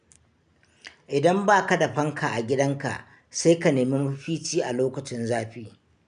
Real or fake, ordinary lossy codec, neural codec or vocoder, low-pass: real; none; none; 9.9 kHz